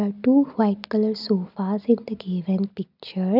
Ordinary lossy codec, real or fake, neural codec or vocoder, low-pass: none; real; none; 5.4 kHz